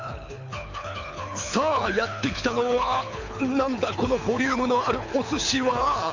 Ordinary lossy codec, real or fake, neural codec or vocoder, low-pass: AAC, 48 kbps; fake; codec, 24 kHz, 6 kbps, HILCodec; 7.2 kHz